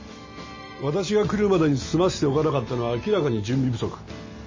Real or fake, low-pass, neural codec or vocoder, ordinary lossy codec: real; 7.2 kHz; none; MP3, 32 kbps